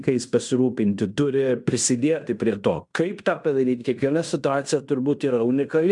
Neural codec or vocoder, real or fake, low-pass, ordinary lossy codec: codec, 16 kHz in and 24 kHz out, 0.9 kbps, LongCat-Audio-Codec, fine tuned four codebook decoder; fake; 10.8 kHz; MP3, 96 kbps